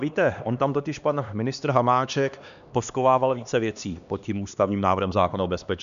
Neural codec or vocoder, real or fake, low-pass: codec, 16 kHz, 2 kbps, X-Codec, HuBERT features, trained on LibriSpeech; fake; 7.2 kHz